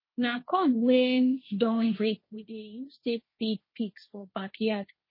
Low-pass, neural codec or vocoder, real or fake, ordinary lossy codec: 5.4 kHz; codec, 16 kHz, 1.1 kbps, Voila-Tokenizer; fake; MP3, 24 kbps